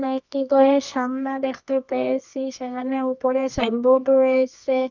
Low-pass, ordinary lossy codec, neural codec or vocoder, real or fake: 7.2 kHz; none; codec, 24 kHz, 0.9 kbps, WavTokenizer, medium music audio release; fake